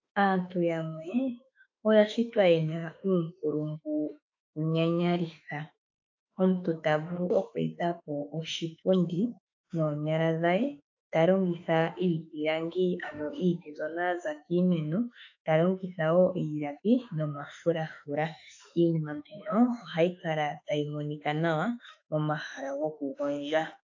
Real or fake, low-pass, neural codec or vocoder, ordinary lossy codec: fake; 7.2 kHz; autoencoder, 48 kHz, 32 numbers a frame, DAC-VAE, trained on Japanese speech; AAC, 48 kbps